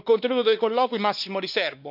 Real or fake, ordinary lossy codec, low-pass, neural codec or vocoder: fake; none; 5.4 kHz; codec, 16 kHz, 2 kbps, X-Codec, WavLM features, trained on Multilingual LibriSpeech